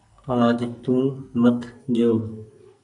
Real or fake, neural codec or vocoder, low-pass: fake; codec, 44.1 kHz, 2.6 kbps, SNAC; 10.8 kHz